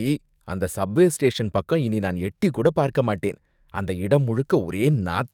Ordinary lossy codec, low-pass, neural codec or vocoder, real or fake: none; 19.8 kHz; codec, 44.1 kHz, 7.8 kbps, DAC; fake